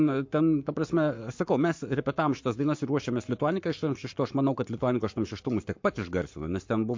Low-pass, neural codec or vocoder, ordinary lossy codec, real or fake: 7.2 kHz; codec, 44.1 kHz, 7.8 kbps, Pupu-Codec; MP3, 48 kbps; fake